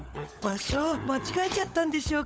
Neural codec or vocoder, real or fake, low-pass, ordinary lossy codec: codec, 16 kHz, 16 kbps, FunCodec, trained on LibriTTS, 50 frames a second; fake; none; none